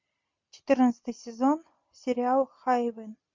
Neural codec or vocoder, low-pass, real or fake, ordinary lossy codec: none; 7.2 kHz; real; MP3, 48 kbps